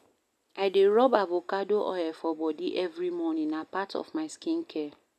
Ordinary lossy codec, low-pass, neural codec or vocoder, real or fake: none; 14.4 kHz; none; real